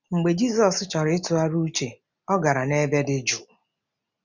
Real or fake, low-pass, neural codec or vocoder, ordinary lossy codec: real; 7.2 kHz; none; none